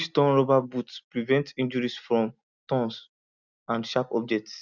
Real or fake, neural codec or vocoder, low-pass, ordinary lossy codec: real; none; 7.2 kHz; none